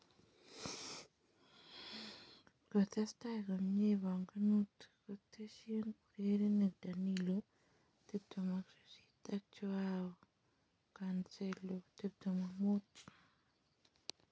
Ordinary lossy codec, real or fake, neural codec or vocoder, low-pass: none; real; none; none